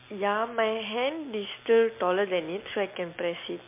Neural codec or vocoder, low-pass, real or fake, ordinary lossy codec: none; 3.6 kHz; real; MP3, 24 kbps